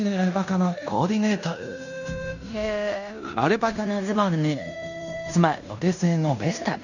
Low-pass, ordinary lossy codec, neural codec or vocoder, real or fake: 7.2 kHz; none; codec, 16 kHz in and 24 kHz out, 0.9 kbps, LongCat-Audio-Codec, fine tuned four codebook decoder; fake